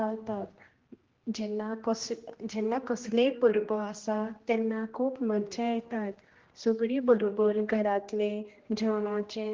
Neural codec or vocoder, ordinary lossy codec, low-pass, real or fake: codec, 16 kHz, 1 kbps, X-Codec, HuBERT features, trained on general audio; Opus, 16 kbps; 7.2 kHz; fake